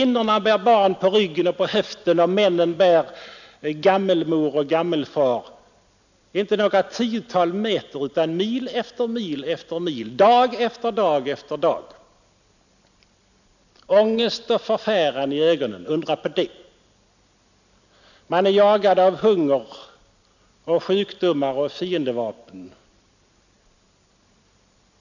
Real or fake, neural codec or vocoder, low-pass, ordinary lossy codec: real; none; 7.2 kHz; none